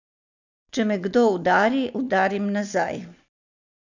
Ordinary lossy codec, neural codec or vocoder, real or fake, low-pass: none; none; real; 7.2 kHz